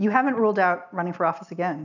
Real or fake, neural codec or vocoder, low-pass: real; none; 7.2 kHz